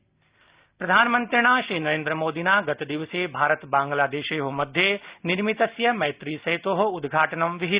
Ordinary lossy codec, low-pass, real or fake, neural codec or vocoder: Opus, 64 kbps; 3.6 kHz; real; none